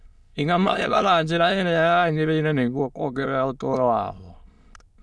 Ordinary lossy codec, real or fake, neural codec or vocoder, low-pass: none; fake; autoencoder, 22.05 kHz, a latent of 192 numbers a frame, VITS, trained on many speakers; none